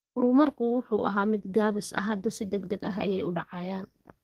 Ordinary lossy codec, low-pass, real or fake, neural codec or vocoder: Opus, 24 kbps; 14.4 kHz; fake; codec, 32 kHz, 1.9 kbps, SNAC